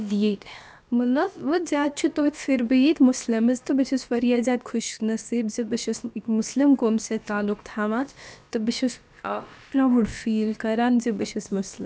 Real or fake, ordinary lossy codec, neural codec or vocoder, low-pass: fake; none; codec, 16 kHz, about 1 kbps, DyCAST, with the encoder's durations; none